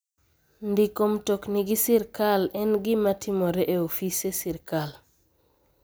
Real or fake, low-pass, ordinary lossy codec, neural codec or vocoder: real; none; none; none